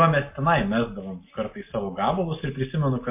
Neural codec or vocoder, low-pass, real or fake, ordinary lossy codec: none; 3.6 kHz; real; MP3, 24 kbps